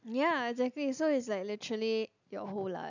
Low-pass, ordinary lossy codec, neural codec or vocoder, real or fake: 7.2 kHz; none; none; real